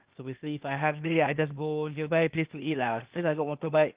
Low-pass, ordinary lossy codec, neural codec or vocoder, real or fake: 3.6 kHz; Opus, 32 kbps; codec, 16 kHz, 0.8 kbps, ZipCodec; fake